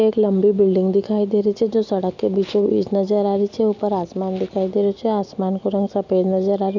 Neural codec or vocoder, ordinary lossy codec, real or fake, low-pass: none; none; real; 7.2 kHz